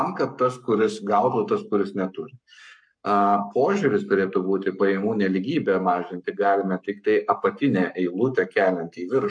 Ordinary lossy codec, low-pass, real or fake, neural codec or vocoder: MP3, 64 kbps; 9.9 kHz; fake; codec, 44.1 kHz, 7.8 kbps, Pupu-Codec